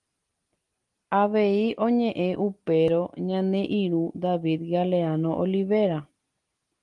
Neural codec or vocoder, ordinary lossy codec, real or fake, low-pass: none; Opus, 24 kbps; real; 10.8 kHz